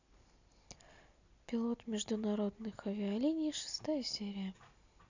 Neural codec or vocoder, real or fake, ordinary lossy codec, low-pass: none; real; none; 7.2 kHz